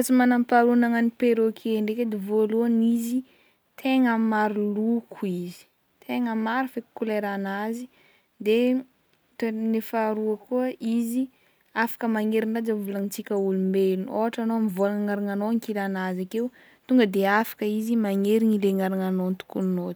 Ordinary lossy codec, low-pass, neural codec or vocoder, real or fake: none; none; none; real